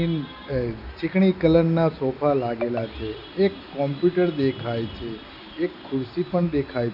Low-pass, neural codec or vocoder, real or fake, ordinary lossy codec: 5.4 kHz; none; real; none